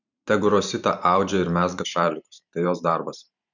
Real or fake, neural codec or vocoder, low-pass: fake; vocoder, 44.1 kHz, 128 mel bands every 512 samples, BigVGAN v2; 7.2 kHz